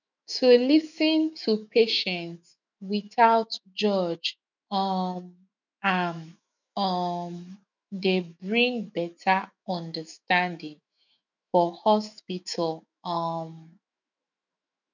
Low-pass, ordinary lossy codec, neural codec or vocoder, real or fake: 7.2 kHz; none; autoencoder, 48 kHz, 128 numbers a frame, DAC-VAE, trained on Japanese speech; fake